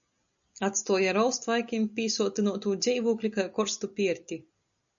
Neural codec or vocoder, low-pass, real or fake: none; 7.2 kHz; real